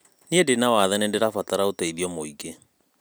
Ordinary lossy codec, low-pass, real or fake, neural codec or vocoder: none; none; real; none